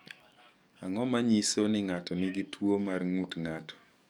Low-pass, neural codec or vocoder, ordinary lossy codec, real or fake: 19.8 kHz; codec, 44.1 kHz, 7.8 kbps, DAC; none; fake